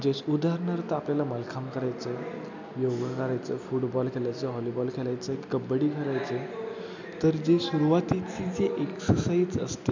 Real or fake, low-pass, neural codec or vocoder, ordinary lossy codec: real; 7.2 kHz; none; MP3, 64 kbps